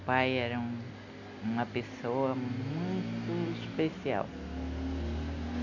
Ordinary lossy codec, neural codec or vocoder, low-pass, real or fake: none; none; 7.2 kHz; real